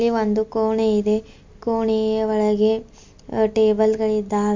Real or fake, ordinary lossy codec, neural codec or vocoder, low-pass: real; MP3, 48 kbps; none; 7.2 kHz